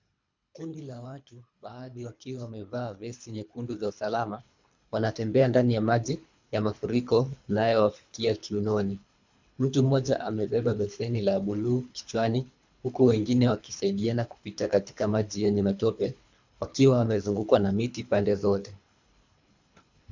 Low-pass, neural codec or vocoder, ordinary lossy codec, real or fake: 7.2 kHz; codec, 24 kHz, 3 kbps, HILCodec; MP3, 64 kbps; fake